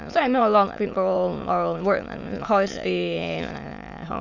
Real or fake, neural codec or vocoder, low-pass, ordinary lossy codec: fake; autoencoder, 22.05 kHz, a latent of 192 numbers a frame, VITS, trained on many speakers; 7.2 kHz; none